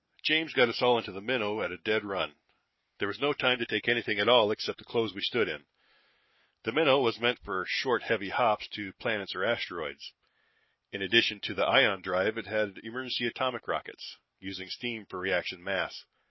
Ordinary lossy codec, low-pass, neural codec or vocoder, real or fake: MP3, 24 kbps; 7.2 kHz; none; real